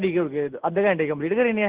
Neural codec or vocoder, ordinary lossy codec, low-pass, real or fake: none; Opus, 16 kbps; 3.6 kHz; real